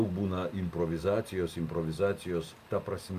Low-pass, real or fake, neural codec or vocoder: 14.4 kHz; real; none